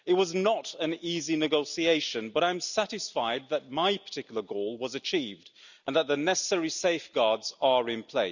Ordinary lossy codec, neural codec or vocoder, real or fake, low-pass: none; none; real; 7.2 kHz